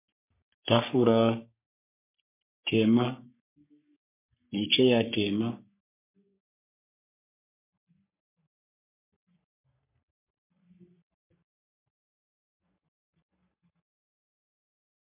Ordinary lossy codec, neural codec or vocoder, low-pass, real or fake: MP3, 24 kbps; codec, 44.1 kHz, 3.4 kbps, Pupu-Codec; 3.6 kHz; fake